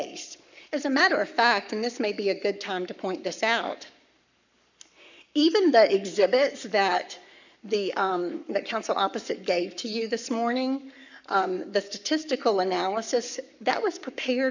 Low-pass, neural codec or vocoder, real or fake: 7.2 kHz; codec, 44.1 kHz, 7.8 kbps, Pupu-Codec; fake